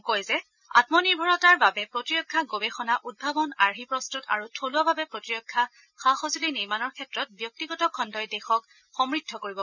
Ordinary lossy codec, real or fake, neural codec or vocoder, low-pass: none; real; none; 7.2 kHz